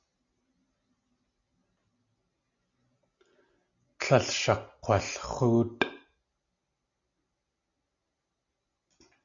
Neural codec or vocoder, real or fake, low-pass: none; real; 7.2 kHz